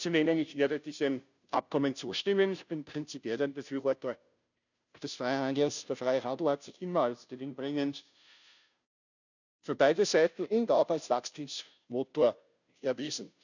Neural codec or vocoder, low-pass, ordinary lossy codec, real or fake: codec, 16 kHz, 0.5 kbps, FunCodec, trained on Chinese and English, 25 frames a second; 7.2 kHz; none; fake